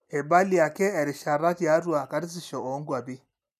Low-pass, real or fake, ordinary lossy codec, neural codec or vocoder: 14.4 kHz; real; none; none